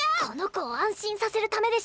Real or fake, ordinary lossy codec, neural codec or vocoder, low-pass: real; none; none; none